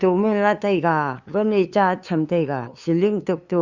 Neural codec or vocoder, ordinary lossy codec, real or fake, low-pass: codec, 16 kHz, 2 kbps, FunCodec, trained on LibriTTS, 25 frames a second; Opus, 64 kbps; fake; 7.2 kHz